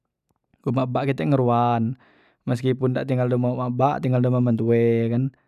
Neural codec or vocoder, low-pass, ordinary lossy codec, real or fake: none; 14.4 kHz; none; real